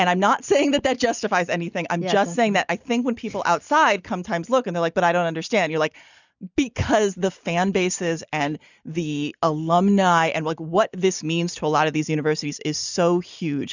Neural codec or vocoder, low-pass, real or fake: none; 7.2 kHz; real